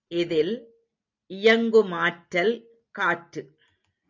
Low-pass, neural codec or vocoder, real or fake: 7.2 kHz; none; real